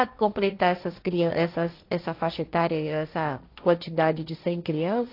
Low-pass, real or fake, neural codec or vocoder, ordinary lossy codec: 5.4 kHz; fake; codec, 16 kHz, 1.1 kbps, Voila-Tokenizer; AAC, 32 kbps